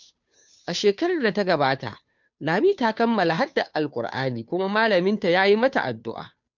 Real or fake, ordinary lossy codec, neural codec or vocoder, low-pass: fake; none; codec, 16 kHz, 2 kbps, FunCodec, trained on Chinese and English, 25 frames a second; 7.2 kHz